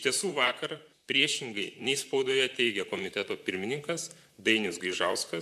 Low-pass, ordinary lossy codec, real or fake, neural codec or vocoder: 14.4 kHz; AAC, 96 kbps; fake; vocoder, 44.1 kHz, 128 mel bands, Pupu-Vocoder